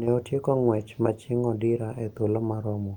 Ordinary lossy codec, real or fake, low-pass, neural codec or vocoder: Opus, 32 kbps; real; 19.8 kHz; none